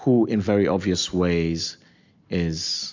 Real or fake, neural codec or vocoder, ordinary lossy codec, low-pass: real; none; MP3, 64 kbps; 7.2 kHz